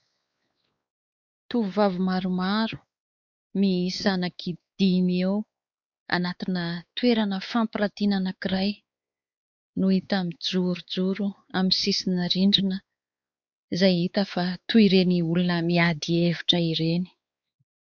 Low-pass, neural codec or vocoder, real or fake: 7.2 kHz; codec, 16 kHz, 4 kbps, X-Codec, WavLM features, trained on Multilingual LibriSpeech; fake